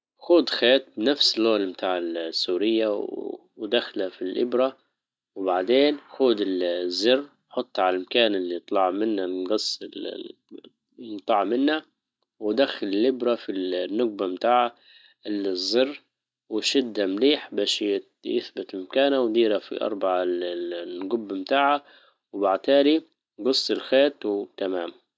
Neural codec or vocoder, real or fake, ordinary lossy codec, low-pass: none; real; none; none